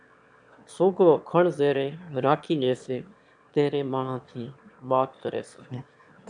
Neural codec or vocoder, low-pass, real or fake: autoencoder, 22.05 kHz, a latent of 192 numbers a frame, VITS, trained on one speaker; 9.9 kHz; fake